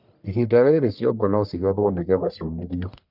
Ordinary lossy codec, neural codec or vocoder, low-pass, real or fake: none; codec, 44.1 kHz, 1.7 kbps, Pupu-Codec; 5.4 kHz; fake